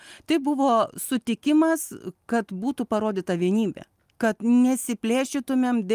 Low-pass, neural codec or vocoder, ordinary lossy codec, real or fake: 14.4 kHz; none; Opus, 32 kbps; real